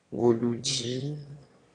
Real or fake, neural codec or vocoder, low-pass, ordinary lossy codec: fake; autoencoder, 22.05 kHz, a latent of 192 numbers a frame, VITS, trained on one speaker; 9.9 kHz; AAC, 32 kbps